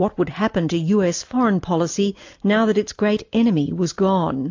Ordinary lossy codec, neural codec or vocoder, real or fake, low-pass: AAC, 48 kbps; none; real; 7.2 kHz